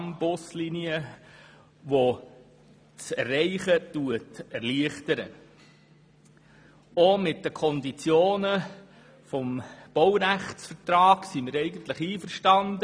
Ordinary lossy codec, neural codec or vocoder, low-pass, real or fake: none; none; none; real